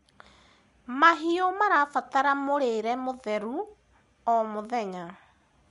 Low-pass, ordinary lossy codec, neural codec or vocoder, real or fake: 10.8 kHz; MP3, 64 kbps; none; real